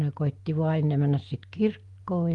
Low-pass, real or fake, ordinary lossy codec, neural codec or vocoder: 10.8 kHz; real; Opus, 24 kbps; none